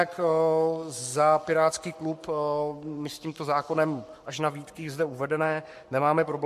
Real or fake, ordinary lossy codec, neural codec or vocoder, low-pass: fake; MP3, 64 kbps; codec, 44.1 kHz, 7.8 kbps, Pupu-Codec; 14.4 kHz